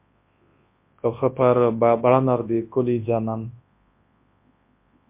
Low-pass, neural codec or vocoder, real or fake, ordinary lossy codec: 3.6 kHz; codec, 24 kHz, 0.9 kbps, WavTokenizer, large speech release; fake; MP3, 24 kbps